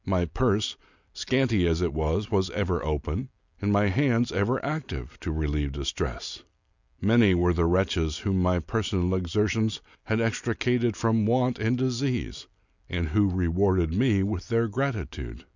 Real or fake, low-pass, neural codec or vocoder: real; 7.2 kHz; none